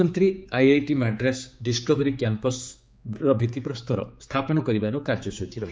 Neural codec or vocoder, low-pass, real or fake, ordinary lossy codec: codec, 16 kHz, 4 kbps, X-Codec, HuBERT features, trained on general audio; none; fake; none